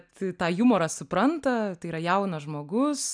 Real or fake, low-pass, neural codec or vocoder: real; 9.9 kHz; none